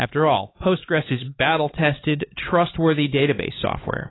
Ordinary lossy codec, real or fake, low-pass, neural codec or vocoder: AAC, 16 kbps; fake; 7.2 kHz; codec, 16 kHz, 4 kbps, X-Codec, HuBERT features, trained on LibriSpeech